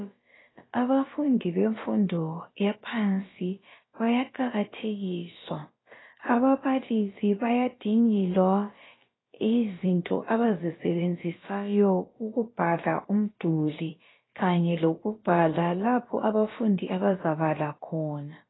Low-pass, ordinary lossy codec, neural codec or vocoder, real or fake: 7.2 kHz; AAC, 16 kbps; codec, 16 kHz, about 1 kbps, DyCAST, with the encoder's durations; fake